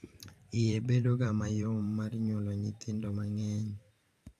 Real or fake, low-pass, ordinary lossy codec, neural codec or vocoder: fake; 14.4 kHz; AAC, 64 kbps; vocoder, 44.1 kHz, 128 mel bands every 512 samples, BigVGAN v2